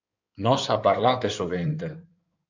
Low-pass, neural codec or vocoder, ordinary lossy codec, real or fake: 7.2 kHz; codec, 16 kHz in and 24 kHz out, 2.2 kbps, FireRedTTS-2 codec; MP3, 64 kbps; fake